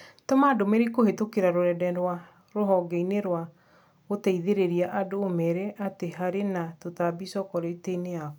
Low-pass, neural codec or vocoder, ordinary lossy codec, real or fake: none; none; none; real